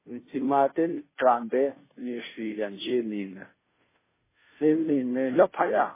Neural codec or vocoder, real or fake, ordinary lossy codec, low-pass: codec, 16 kHz, 0.5 kbps, FunCodec, trained on Chinese and English, 25 frames a second; fake; MP3, 16 kbps; 3.6 kHz